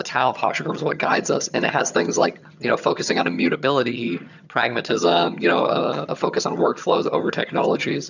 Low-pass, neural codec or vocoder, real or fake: 7.2 kHz; vocoder, 22.05 kHz, 80 mel bands, HiFi-GAN; fake